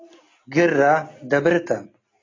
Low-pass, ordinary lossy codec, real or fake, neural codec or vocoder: 7.2 kHz; AAC, 32 kbps; real; none